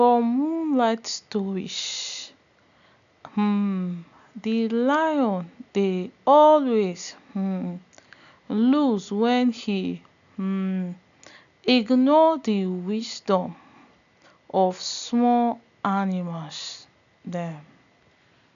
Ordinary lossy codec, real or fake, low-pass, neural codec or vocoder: none; real; 7.2 kHz; none